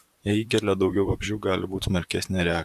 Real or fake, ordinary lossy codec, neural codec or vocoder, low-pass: fake; AAC, 96 kbps; vocoder, 44.1 kHz, 128 mel bands, Pupu-Vocoder; 14.4 kHz